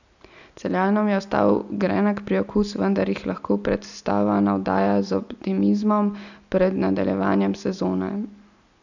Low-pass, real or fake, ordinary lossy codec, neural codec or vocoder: 7.2 kHz; real; none; none